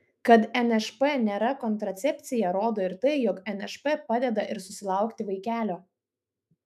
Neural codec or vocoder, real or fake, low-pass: autoencoder, 48 kHz, 128 numbers a frame, DAC-VAE, trained on Japanese speech; fake; 14.4 kHz